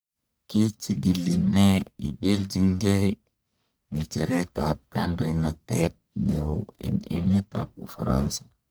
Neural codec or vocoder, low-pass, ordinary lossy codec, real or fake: codec, 44.1 kHz, 1.7 kbps, Pupu-Codec; none; none; fake